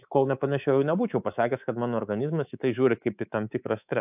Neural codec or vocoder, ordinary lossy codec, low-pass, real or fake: codec, 24 kHz, 3.1 kbps, DualCodec; AAC, 32 kbps; 3.6 kHz; fake